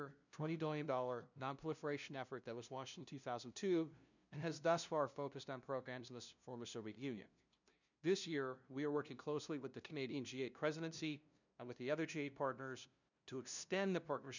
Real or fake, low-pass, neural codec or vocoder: fake; 7.2 kHz; codec, 16 kHz, 0.5 kbps, FunCodec, trained on LibriTTS, 25 frames a second